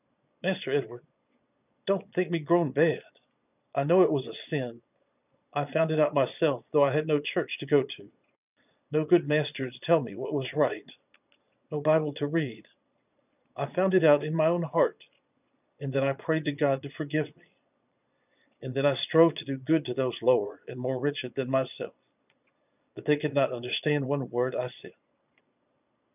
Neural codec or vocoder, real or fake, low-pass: vocoder, 22.05 kHz, 80 mel bands, Vocos; fake; 3.6 kHz